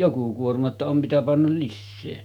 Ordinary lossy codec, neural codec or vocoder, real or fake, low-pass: MP3, 96 kbps; autoencoder, 48 kHz, 128 numbers a frame, DAC-VAE, trained on Japanese speech; fake; 19.8 kHz